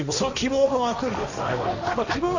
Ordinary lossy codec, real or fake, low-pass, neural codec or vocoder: none; fake; 7.2 kHz; codec, 16 kHz, 1.1 kbps, Voila-Tokenizer